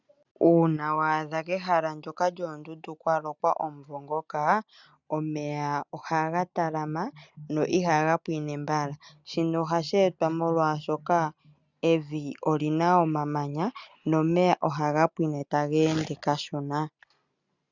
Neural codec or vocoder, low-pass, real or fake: none; 7.2 kHz; real